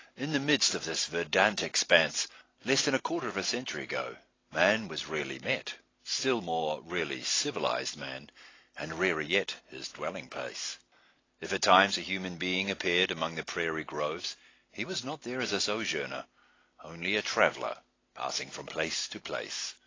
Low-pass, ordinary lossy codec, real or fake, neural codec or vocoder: 7.2 kHz; AAC, 32 kbps; real; none